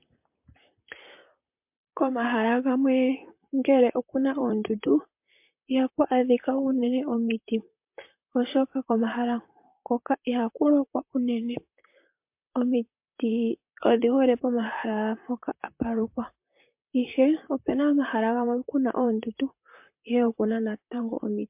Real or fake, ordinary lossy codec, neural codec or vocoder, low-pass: fake; MP3, 32 kbps; vocoder, 44.1 kHz, 128 mel bands, Pupu-Vocoder; 3.6 kHz